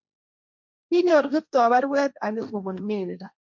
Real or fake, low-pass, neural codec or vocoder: fake; 7.2 kHz; codec, 16 kHz, 1.1 kbps, Voila-Tokenizer